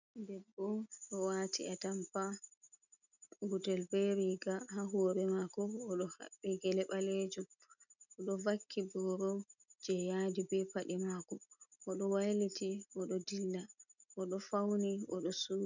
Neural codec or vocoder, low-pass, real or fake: none; 7.2 kHz; real